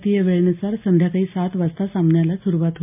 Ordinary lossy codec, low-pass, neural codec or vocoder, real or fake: MP3, 32 kbps; 3.6 kHz; none; real